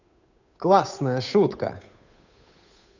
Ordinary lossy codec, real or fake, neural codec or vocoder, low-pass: none; fake; codec, 16 kHz, 8 kbps, FunCodec, trained on Chinese and English, 25 frames a second; 7.2 kHz